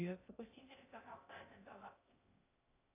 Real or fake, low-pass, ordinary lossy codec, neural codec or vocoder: fake; 3.6 kHz; AAC, 16 kbps; codec, 16 kHz in and 24 kHz out, 0.6 kbps, FocalCodec, streaming, 2048 codes